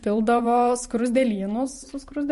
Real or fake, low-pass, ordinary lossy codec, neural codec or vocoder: fake; 14.4 kHz; MP3, 48 kbps; vocoder, 44.1 kHz, 128 mel bands every 512 samples, BigVGAN v2